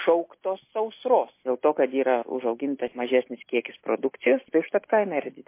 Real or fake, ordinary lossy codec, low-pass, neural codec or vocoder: real; MP3, 24 kbps; 3.6 kHz; none